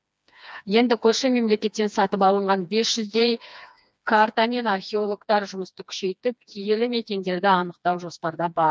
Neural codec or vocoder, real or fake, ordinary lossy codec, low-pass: codec, 16 kHz, 2 kbps, FreqCodec, smaller model; fake; none; none